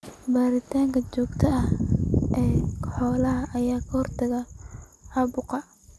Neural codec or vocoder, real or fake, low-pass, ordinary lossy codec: none; real; none; none